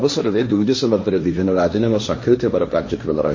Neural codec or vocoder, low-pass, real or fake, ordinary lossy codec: codec, 16 kHz, 1.1 kbps, Voila-Tokenizer; 7.2 kHz; fake; MP3, 32 kbps